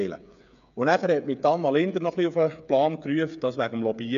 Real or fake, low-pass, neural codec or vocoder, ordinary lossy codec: fake; 7.2 kHz; codec, 16 kHz, 8 kbps, FreqCodec, smaller model; none